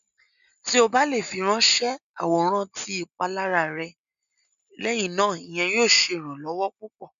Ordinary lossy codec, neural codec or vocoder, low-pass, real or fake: none; none; 7.2 kHz; real